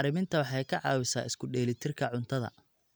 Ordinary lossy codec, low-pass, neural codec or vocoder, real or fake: none; none; none; real